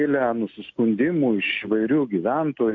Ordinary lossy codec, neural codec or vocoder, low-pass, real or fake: MP3, 64 kbps; none; 7.2 kHz; real